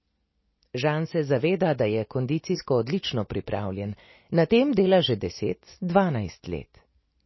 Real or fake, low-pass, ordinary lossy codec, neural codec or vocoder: real; 7.2 kHz; MP3, 24 kbps; none